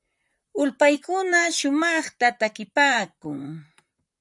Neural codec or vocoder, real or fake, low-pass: vocoder, 44.1 kHz, 128 mel bands, Pupu-Vocoder; fake; 10.8 kHz